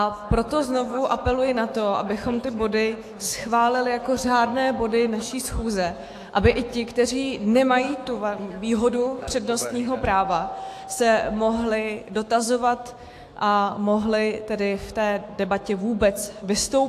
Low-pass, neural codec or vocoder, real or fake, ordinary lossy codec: 14.4 kHz; autoencoder, 48 kHz, 128 numbers a frame, DAC-VAE, trained on Japanese speech; fake; AAC, 64 kbps